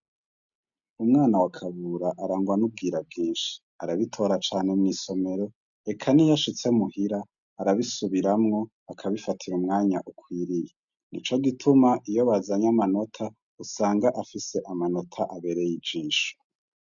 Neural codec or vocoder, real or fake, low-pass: none; real; 7.2 kHz